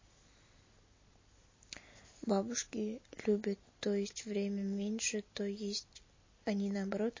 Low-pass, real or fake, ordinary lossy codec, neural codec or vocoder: 7.2 kHz; real; MP3, 32 kbps; none